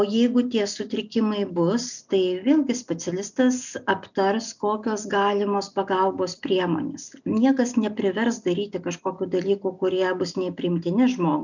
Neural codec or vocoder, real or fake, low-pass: none; real; 7.2 kHz